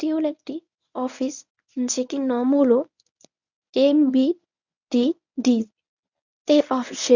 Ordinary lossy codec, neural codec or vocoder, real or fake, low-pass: none; codec, 24 kHz, 0.9 kbps, WavTokenizer, medium speech release version 1; fake; 7.2 kHz